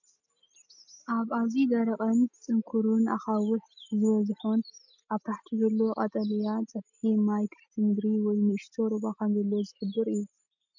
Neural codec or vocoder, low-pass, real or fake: none; 7.2 kHz; real